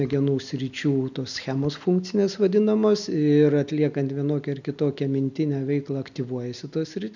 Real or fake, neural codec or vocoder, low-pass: real; none; 7.2 kHz